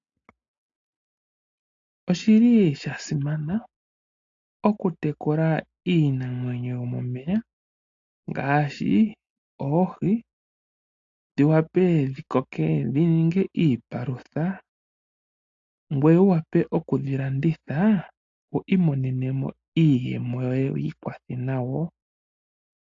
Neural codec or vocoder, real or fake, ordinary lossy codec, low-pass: none; real; AAC, 48 kbps; 7.2 kHz